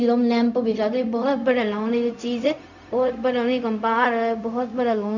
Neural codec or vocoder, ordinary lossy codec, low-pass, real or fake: codec, 16 kHz, 0.4 kbps, LongCat-Audio-Codec; none; 7.2 kHz; fake